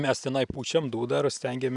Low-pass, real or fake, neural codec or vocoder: 10.8 kHz; real; none